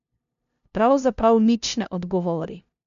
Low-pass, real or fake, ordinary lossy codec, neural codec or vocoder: 7.2 kHz; fake; none; codec, 16 kHz, 0.5 kbps, FunCodec, trained on LibriTTS, 25 frames a second